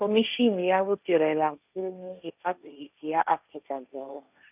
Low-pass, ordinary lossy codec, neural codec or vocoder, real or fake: 3.6 kHz; none; codec, 16 kHz, 1.1 kbps, Voila-Tokenizer; fake